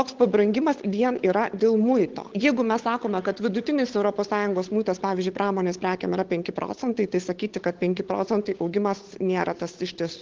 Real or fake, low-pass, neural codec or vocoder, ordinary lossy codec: fake; 7.2 kHz; codec, 16 kHz, 8 kbps, FunCodec, trained on Chinese and English, 25 frames a second; Opus, 16 kbps